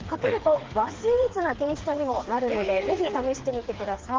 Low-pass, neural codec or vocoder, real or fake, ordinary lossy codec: 7.2 kHz; codec, 16 kHz, 4 kbps, FreqCodec, smaller model; fake; Opus, 16 kbps